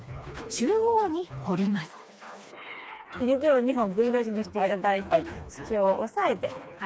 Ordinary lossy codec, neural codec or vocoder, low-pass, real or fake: none; codec, 16 kHz, 2 kbps, FreqCodec, smaller model; none; fake